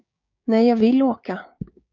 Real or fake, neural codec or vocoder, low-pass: fake; codec, 16 kHz, 6 kbps, DAC; 7.2 kHz